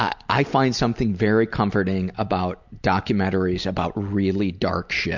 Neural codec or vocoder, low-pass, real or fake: none; 7.2 kHz; real